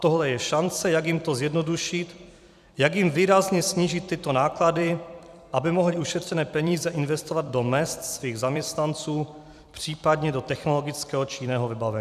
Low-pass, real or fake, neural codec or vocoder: 14.4 kHz; fake; vocoder, 44.1 kHz, 128 mel bands every 256 samples, BigVGAN v2